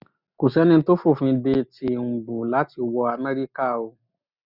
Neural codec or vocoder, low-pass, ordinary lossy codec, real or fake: none; 5.4 kHz; none; real